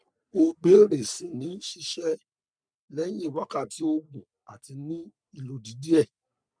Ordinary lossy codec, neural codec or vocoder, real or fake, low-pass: none; codec, 44.1 kHz, 3.4 kbps, Pupu-Codec; fake; 9.9 kHz